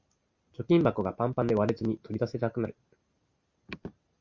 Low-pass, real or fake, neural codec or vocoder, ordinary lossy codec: 7.2 kHz; real; none; Opus, 64 kbps